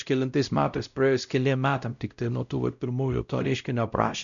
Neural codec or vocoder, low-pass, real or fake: codec, 16 kHz, 0.5 kbps, X-Codec, WavLM features, trained on Multilingual LibriSpeech; 7.2 kHz; fake